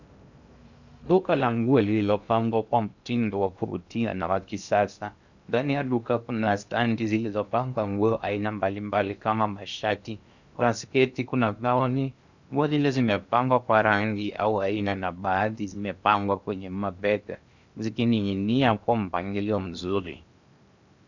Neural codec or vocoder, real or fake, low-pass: codec, 16 kHz in and 24 kHz out, 0.6 kbps, FocalCodec, streaming, 2048 codes; fake; 7.2 kHz